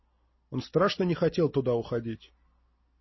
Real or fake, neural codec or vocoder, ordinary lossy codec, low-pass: real; none; MP3, 24 kbps; 7.2 kHz